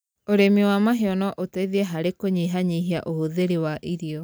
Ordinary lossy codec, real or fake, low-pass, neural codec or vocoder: none; real; none; none